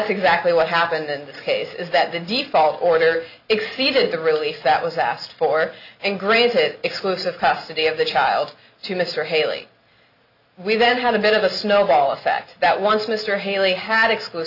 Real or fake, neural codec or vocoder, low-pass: real; none; 5.4 kHz